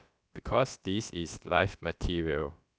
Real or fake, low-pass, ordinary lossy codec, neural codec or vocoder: fake; none; none; codec, 16 kHz, about 1 kbps, DyCAST, with the encoder's durations